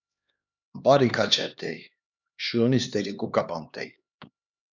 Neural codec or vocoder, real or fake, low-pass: codec, 16 kHz, 2 kbps, X-Codec, HuBERT features, trained on LibriSpeech; fake; 7.2 kHz